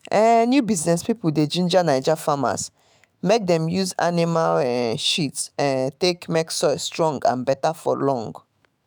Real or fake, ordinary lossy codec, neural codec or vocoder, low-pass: fake; none; autoencoder, 48 kHz, 128 numbers a frame, DAC-VAE, trained on Japanese speech; none